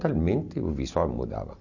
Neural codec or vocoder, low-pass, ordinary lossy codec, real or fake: none; 7.2 kHz; none; real